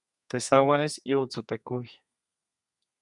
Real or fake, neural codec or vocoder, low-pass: fake; codec, 32 kHz, 1.9 kbps, SNAC; 10.8 kHz